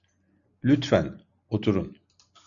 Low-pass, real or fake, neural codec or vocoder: 7.2 kHz; real; none